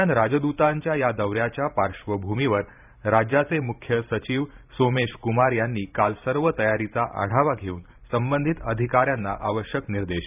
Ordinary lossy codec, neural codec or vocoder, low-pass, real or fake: none; vocoder, 44.1 kHz, 128 mel bands every 512 samples, BigVGAN v2; 3.6 kHz; fake